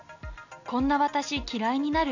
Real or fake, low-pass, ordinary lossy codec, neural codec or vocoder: real; 7.2 kHz; none; none